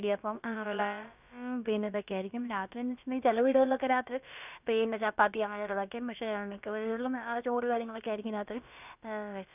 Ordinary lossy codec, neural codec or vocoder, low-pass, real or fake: none; codec, 16 kHz, about 1 kbps, DyCAST, with the encoder's durations; 3.6 kHz; fake